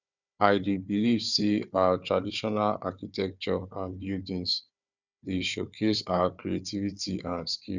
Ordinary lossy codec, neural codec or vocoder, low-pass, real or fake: none; codec, 16 kHz, 4 kbps, FunCodec, trained on Chinese and English, 50 frames a second; 7.2 kHz; fake